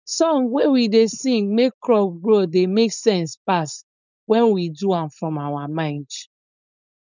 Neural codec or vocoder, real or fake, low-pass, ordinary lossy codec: codec, 16 kHz, 4.8 kbps, FACodec; fake; 7.2 kHz; none